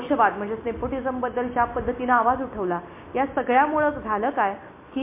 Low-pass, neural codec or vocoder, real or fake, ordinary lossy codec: 3.6 kHz; none; real; MP3, 24 kbps